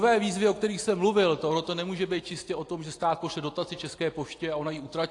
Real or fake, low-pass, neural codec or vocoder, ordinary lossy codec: real; 10.8 kHz; none; AAC, 48 kbps